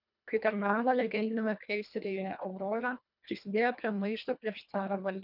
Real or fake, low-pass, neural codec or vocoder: fake; 5.4 kHz; codec, 24 kHz, 1.5 kbps, HILCodec